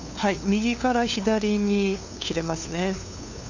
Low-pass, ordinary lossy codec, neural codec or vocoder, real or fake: 7.2 kHz; none; codec, 16 kHz, 2 kbps, FunCodec, trained on LibriTTS, 25 frames a second; fake